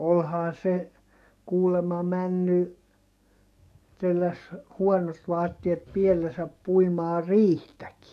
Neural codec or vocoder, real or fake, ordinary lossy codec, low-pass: codec, 44.1 kHz, 7.8 kbps, DAC; fake; none; 14.4 kHz